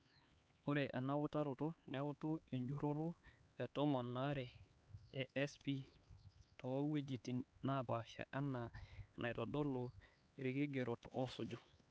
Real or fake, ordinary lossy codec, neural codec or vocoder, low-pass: fake; none; codec, 16 kHz, 4 kbps, X-Codec, HuBERT features, trained on LibriSpeech; none